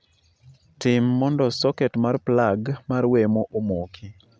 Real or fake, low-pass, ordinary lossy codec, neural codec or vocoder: real; none; none; none